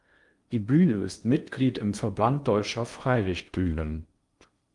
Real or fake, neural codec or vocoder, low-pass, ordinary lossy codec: fake; codec, 16 kHz in and 24 kHz out, 0.6 kbps, FocalCodec, streaming, 4096 codes; 10.8 kHz; Opus, 32 kbps